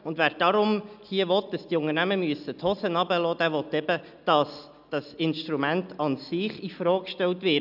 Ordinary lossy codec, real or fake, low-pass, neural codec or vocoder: none; real; 5.4 kHz; none